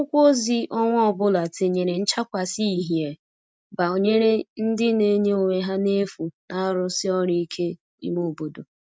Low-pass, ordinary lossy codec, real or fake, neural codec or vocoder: none; none; real; none